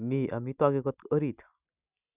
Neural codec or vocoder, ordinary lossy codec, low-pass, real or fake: none; none; 3.6 kHz; real